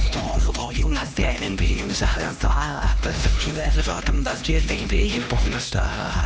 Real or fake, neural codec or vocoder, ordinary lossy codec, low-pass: fake; codec, 16 kHz, 1 kbps, X-Codec, HuBERT features, trained on LibriSpeech; none; none